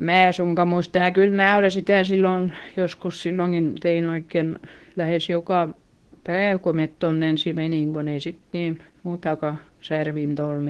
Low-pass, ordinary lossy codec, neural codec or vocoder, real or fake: 10.8 kHz; Opus, 16 kbps; codec, 24 kHz, 0.9 kbps, WavTokenizer, medium speech release version 2; fake